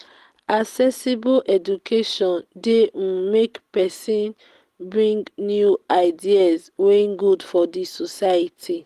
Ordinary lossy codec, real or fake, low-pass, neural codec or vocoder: Opus, 24 kbps; real; 14.4 kHz; none